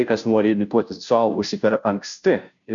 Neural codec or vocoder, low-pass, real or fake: codec, 16 kHz, 0.5 kbps, FunCodec, trained on Chinese and English, 25 frames a second; 7.2 kHz; fake